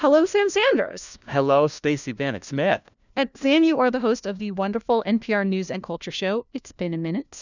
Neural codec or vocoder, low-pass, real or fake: codec, 16 kHz, 1 kbps, FunCodec, trained on LibriTTS, 50 frames a second; 7.2 kHz; fake